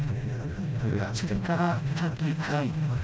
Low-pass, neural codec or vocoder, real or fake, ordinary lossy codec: none; codec, 16 kHz, 0.5 kbps, FreqCodec, smaller model; fake; none